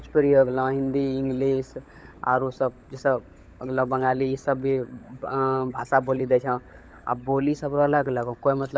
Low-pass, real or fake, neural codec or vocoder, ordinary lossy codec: none; fake; codec, 16 kHz, 16 kbps, FunCodec, trained on LibriTTS, 50 frames a second; none